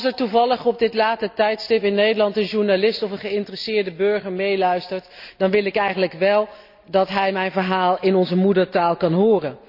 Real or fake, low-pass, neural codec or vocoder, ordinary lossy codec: real; 5.4 kHz; none; none